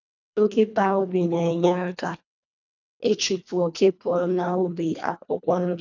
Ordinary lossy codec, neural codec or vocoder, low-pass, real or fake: none; codec, 24 kHz, 1.5 kbps, HILCodec; 7.2 kHz; fake